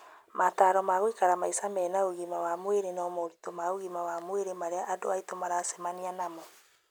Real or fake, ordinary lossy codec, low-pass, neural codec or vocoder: real; none; none; none